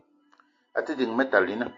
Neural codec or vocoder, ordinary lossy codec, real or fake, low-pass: none; AAC, 48 kbps; real; 7.2 kHz